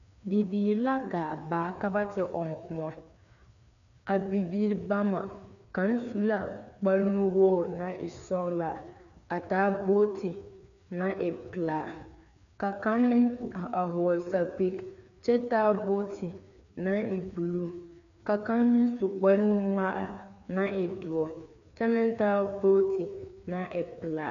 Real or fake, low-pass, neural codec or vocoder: fake; 7.2 kHz; codec, 16 kHz, 2 kbps, FreqCodec, larger model